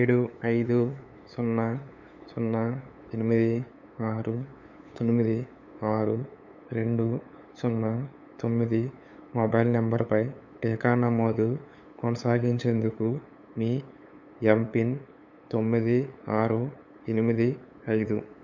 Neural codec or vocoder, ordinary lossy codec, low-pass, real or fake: codec, 16 kHz, 8 kbps, FunCodec, trained on LibriTTS, 25 frames a second; none; 7.2 kHz; fake